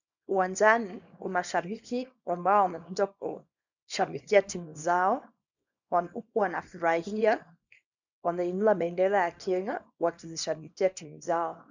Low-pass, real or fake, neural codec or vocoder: 7.2 kHz; fake; codec, 24 kHz, 0.9 kbps, WavTokenizer, small release